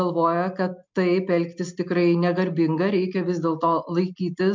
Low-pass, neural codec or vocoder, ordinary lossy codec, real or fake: 7.2 kHz; none; MP3, 64 kbps; real